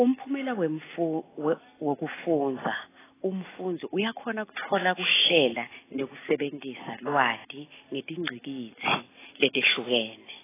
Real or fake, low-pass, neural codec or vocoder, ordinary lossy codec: real; 3.6 kHz; none; AAC, 16 kbps